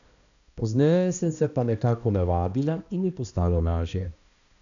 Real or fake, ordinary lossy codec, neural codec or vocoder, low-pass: fake; none; codec, 16 kHz, 1 kbps, X-Codec, HuBERT features, trained on balanced general audio; 7.2 kHz